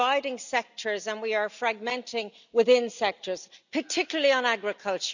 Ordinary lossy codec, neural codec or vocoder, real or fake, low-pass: none; none; real; 7.2 kHz